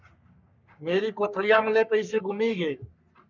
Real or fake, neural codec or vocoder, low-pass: fake; codec, 44.1 kHz, 3.4 kbps, Pupu-Codec; 7.2 kHz